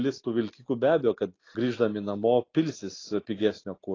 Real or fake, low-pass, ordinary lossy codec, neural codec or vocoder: real; 7.2 kHz; AAC, 32 kbps; none